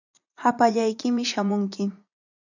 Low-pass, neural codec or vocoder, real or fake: 7.2 kHz; vocoder, 44.1 kHz, 80 mel bands, Vocos; fake